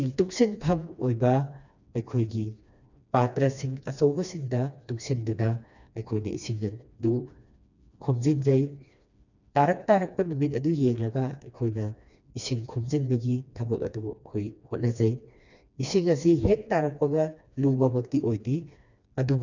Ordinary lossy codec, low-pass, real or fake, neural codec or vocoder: none; 7.2 kHz; fake; codec, 16 kHz, 2 kbps, FreqCodec, smaller model